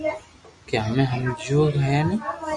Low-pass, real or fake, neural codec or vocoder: 10.8 kHz; real; none